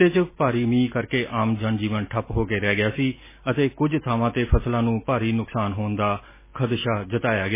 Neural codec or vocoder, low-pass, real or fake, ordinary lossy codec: none; 3.6 kHz; real; MP3, 16 kbps